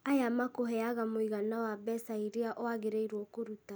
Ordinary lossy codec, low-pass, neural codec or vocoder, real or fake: none; none; none; real